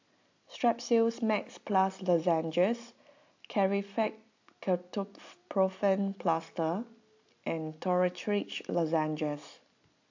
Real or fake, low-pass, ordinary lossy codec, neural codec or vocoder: real; 7.2 kHz; AAC, 48 kbps; none